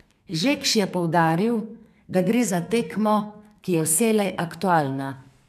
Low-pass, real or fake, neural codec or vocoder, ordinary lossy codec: 14.4 kHz; fake; codec, 32 kHz, 1.9 kbps, SNAC; none